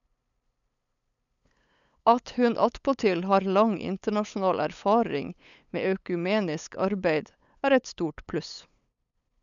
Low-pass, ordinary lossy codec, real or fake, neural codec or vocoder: 7.2 kHz; none; fake; codec, 16 kHz, 8 kbps, FunCodec, trained on LibriTTS, 25 frames a second